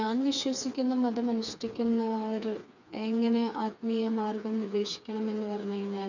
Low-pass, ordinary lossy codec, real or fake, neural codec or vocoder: 7.2 kHz; none; fake; codec, 16 kHz, 4 kbps, FreqCodec, smaller model